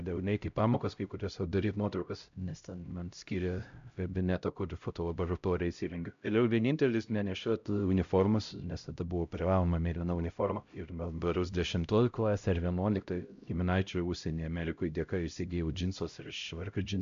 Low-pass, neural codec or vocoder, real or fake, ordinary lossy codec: 7.2 kHz; codec, 16 kHz, 0.5 kbps, X-Codec, HuBERT features, trained on LibriSpeech; fake; MP3, 64 kbps